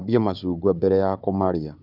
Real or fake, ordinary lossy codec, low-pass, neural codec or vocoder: fake; none; 5.4 kHz; codec, 16 kHz, 4 kbps, FunCodec, trained on Chinese and English, 50 frames a second